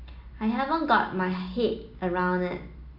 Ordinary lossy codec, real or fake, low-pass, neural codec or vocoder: AAC, 48 kbps; real; 5.4 kHz; none